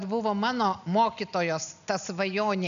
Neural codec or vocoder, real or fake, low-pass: none; real; 7.2 kHz